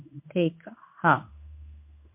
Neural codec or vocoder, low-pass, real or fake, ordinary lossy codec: codec, 16 kHz in and 24 kHz out, 1 kbps, XY-Tokenizer; 3.6 kHz; fake; MP3, 32 kbps